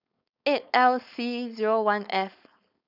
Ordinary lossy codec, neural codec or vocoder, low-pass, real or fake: none; codec, 16 kHz, 4.8 kbps, FACodec; 5.4 kHz; fake